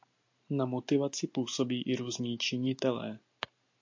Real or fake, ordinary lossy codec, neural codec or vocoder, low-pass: real; MP3, 48 kbps; none; 7.2 kHz